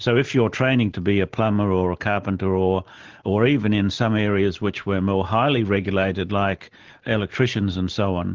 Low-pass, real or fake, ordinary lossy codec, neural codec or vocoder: 7.2 kHz; real; Opus, 16 kbps; none